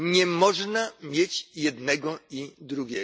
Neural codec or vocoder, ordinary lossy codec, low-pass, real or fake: none; none; none; real